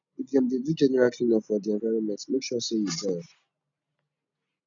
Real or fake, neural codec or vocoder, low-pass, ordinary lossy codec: real; none; 7.2 kHz; none